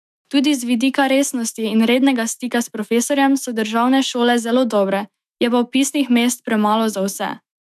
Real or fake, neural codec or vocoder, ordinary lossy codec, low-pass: fake; autoencoder, 48 kHz, 128 numbers a frame, DAC-VAE, trained on Japanese speech; none; 14.4 kHz